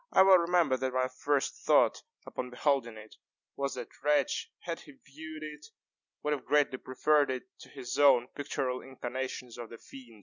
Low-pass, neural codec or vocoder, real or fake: 7.2 kHz; none; real